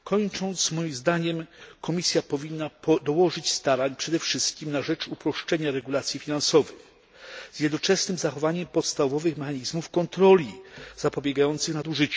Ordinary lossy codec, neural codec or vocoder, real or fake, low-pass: none; none; real; none